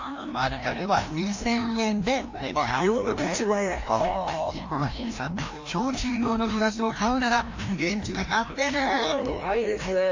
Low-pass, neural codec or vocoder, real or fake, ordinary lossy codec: 7.2 kHz; codec, 16 kHz, 1 kbps, FreqCodec, larger model; fake; AAC, 48 kbps